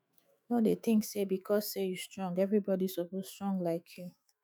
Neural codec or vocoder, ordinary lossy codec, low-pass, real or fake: autoencoder, 48 kHz, 128 numbers a frame, DAC-VAE, trained on Japanese speech; none; none; fake